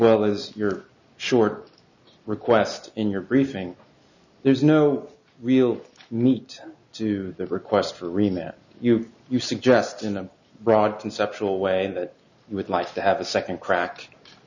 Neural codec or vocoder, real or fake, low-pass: none; real; 7.2 kHz